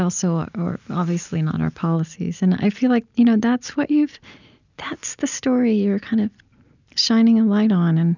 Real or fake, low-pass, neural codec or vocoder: real; 7.2 kHz; none